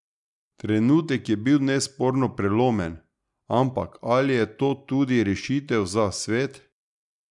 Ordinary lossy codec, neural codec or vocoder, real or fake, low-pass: none; none; real; 10.8 kHz